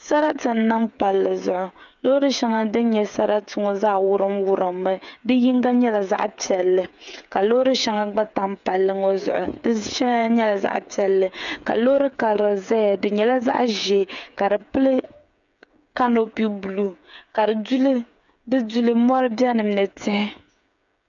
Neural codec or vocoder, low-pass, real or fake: codec, 16 kHz, 8 kbps, FreqCodec, smaller model; 7.2 kHz; fake